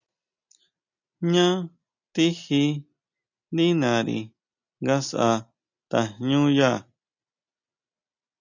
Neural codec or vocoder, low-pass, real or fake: none; 7.2 kHz; real